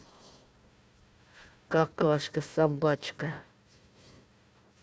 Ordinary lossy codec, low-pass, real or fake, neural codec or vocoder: none; none; fake; codec, 16 kHz, 1 kbps, FunCodec, trained on Chinese and English, 50 frames a second